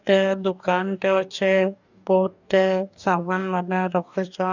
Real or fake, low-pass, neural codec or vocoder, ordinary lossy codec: fake; 7.2 kHz; codec, 44.1 kHz, 2.6 kbps, DAC; none